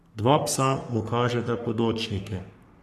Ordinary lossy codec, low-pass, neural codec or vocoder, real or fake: none; 14.4 kHz; codec, 44.1 kHz, 3.4 kbps, Pupu-Codec; fake